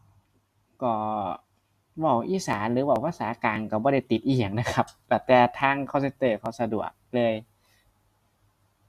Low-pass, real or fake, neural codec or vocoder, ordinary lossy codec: 14.4 kHz; real; none; Opus, 64 kbps